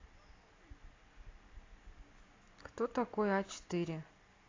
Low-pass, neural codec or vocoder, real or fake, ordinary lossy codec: 7.2 kHz; none; real; AAC, 32 kbps